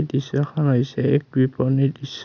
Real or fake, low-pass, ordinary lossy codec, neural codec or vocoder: real; 7.2 kHz; none; none